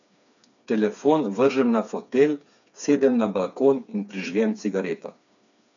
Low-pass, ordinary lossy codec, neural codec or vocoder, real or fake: 7.2 kHz; none; codec, 16 kHz, 4 kbps, FreqCodec, smaller model; fake